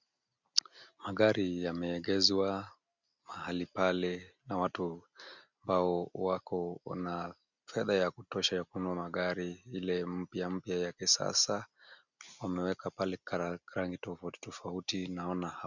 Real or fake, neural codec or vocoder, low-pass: real; none; 7.2 kHz